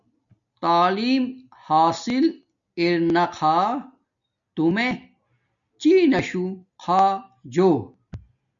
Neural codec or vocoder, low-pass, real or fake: none; 7.2 kHz; real